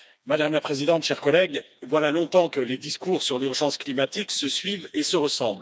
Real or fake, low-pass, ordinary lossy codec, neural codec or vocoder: fake; none; none; codec, 16 kHz, 2 kbps, FreqCodec, smaller model